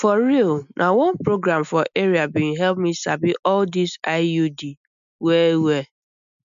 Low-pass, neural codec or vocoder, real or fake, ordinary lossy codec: 7.2 kHz; none; real; MP3, 96 kbps